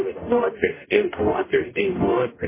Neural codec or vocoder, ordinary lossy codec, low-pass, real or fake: codec, 44.1 kHz, 0.9 kbps, DAC; MP3, 16 kbps; 3.6 kHz; fake